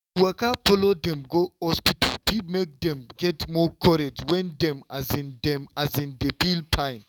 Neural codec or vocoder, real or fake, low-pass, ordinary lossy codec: codec, 44.1 kHz, 7.8 kbps, DAC; fake; 19.8 kHz; none